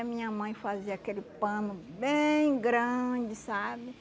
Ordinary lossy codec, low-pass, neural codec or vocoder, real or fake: none; none; none; real